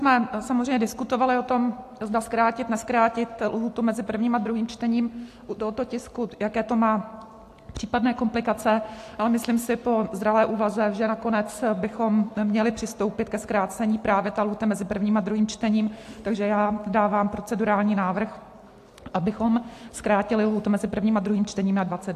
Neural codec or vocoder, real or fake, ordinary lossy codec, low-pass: none; real; AAC, 64 kbps; 14.4 kHz